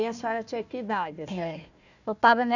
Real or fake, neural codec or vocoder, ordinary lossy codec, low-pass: fake; codec, 16 kHz, 1 kbps, FunCodec, trained on Chinese and English, 50 frames a second; none; 7.2 kHz